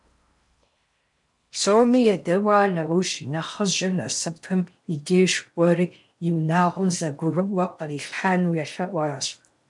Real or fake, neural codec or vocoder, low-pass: fake; codec, 16 kHz in and 24 kHz out, 0.6 kbps, FocalCodec, streaming, 4096 codes; 10.8 kHz